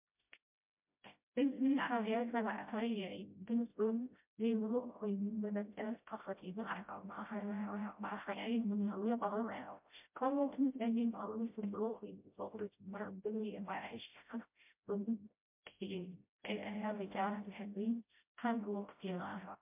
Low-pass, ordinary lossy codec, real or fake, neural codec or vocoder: 3.6 kHz; MP3, 32 kbps; fake; codec, 16 kHz, 0.5 kbps, FreqCodec, smaller model